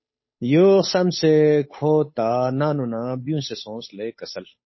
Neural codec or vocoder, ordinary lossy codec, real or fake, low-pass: codec, 16 kHz, 8 kbps, FunCodec, trained on Chinese and English, 25 frames a second; MP3, 24 kbps; fake; 7.2 kHz